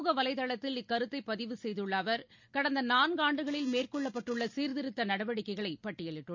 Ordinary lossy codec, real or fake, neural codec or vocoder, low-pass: MP3, 64 kbps; real; none; 7.2 kHz